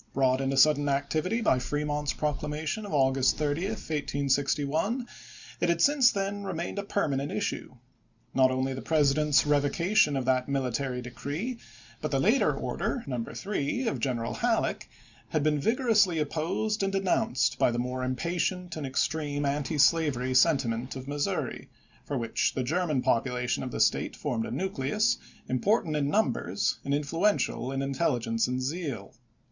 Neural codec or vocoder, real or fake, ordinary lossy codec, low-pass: none; real; Opus, 64 kbps; 7.2 kHz